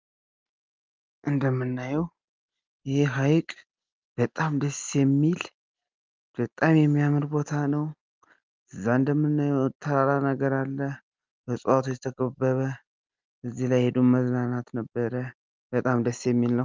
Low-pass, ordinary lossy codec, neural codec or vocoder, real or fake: 7.2 kHz; Opus, 32 kbps; none; real